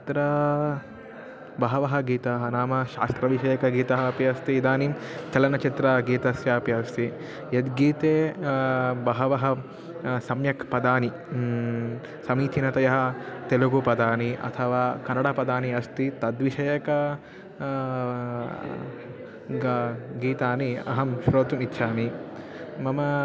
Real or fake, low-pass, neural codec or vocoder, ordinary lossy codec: real; none; none; none